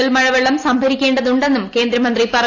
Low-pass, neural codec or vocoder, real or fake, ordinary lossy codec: 7.2 kHz; none; real; AAC, 48 kbps